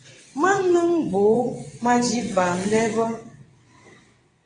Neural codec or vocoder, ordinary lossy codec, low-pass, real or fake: vocoder, 22.05 kHz, 80 mel bands, WaveNeXt; AAC, 32 kbps; 9.9 kHz; fake